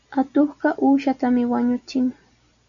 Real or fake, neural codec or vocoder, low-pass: real; none; 7.2 kHz